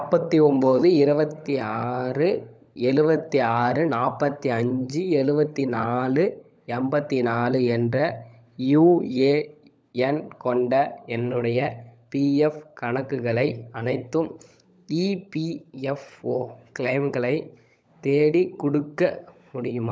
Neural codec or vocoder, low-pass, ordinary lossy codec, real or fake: codec, 16 kHz, 16 kbps, FunCodec, trained on LibriTTS, 50 frames a second; none; none; fake